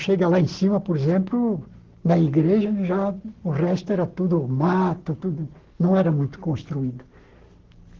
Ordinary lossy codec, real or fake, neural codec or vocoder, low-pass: Opus, 16 kbps; fake; codec, 44.1 kHz, 7.8 kbps, Pupu-Codec; 7.2 kHz